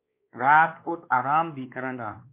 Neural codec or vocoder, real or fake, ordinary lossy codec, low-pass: codec, 16 kHz, 2 kbps, X-Codec, WavLM features, trained on Multilingual LibriSpeech; fake; MP3, 24 kbps; 3.6 kHz